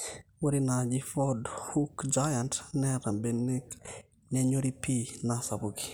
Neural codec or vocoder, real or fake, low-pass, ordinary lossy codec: none; real; none; none